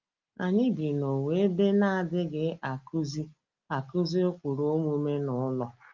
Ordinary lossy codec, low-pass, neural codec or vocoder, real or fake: Opus, 24 kbps; 7.2 kHz; none; real